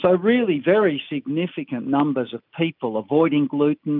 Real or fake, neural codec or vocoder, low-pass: real; none; 5.4 kHz